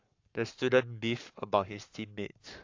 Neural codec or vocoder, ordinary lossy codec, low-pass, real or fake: codec, 44.1 kHz, 7.8 kbps, Pupu-Codec; AAC, 48 kbps; 7.2 kHz; fake